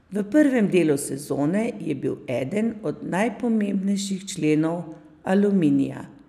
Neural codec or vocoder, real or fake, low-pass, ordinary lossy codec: none; real; 14.4 kHz; none